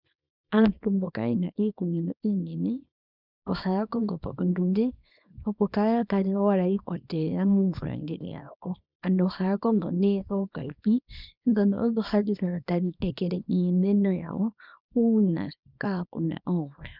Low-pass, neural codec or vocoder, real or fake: 5.4 kHz; codec, 24 kHz, 0.9 kbps, WavTokenizer, small release; fake